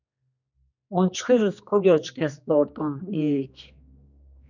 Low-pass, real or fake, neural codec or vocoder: 7.2 kHz; fake; codec, 16 kHz, 2 kbps, X-Codec, HuBERT features, trained on general audio